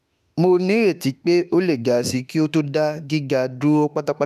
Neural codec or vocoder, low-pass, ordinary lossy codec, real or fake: autoencoder, 48 kHz, 32 numbers a frame, DAC-VAE, trained on Japanese speech; 14.4 kHz; none; fake